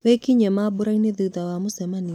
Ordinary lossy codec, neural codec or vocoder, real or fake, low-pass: none; none; real; 19.8 kHz